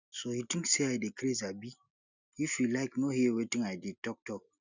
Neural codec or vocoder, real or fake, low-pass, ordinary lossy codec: none; real; 7.2 kHz; none